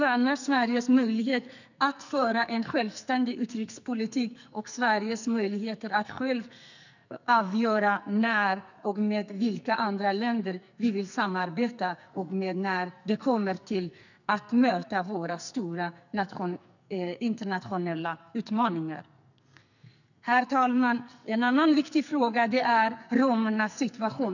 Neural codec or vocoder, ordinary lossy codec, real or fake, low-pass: codec, 44.1 kHz, 2.6 kbps, SNAC; none; fake; 7.2 kHz